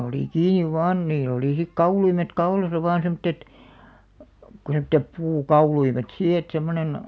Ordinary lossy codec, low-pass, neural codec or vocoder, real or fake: none; none; none; real